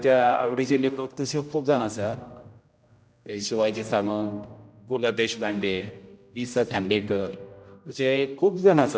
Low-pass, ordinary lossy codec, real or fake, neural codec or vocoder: none; none; fake; codec, 16 kHz, 0.5 kbps, X-Codec, HuBERT features, trained on general audio